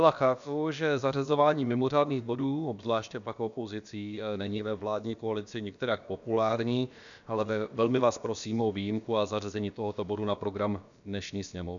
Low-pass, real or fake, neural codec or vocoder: 7.2 kHz; fake; codec, 16 kHz, about 1 kbps, DyCAST, with the encoder's durations